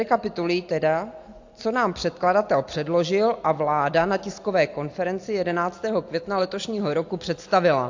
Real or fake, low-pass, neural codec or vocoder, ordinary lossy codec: real; 7.2 kHz; none; AAC, 48 kbps